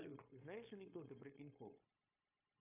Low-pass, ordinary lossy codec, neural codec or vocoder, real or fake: 3.6 kHz; AAC, 32 kbps; codec, 16 kHz, 0.9 kbps, LongCat-Audio-Codec; fake